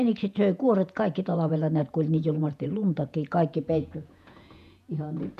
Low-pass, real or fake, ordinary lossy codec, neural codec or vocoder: 14.4 kHz; fake; none; vocoder, 48 kHz, 128 mel bands, Vocos